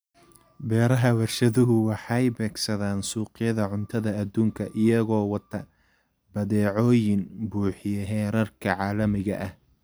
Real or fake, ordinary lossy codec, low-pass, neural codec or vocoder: real; none; none; none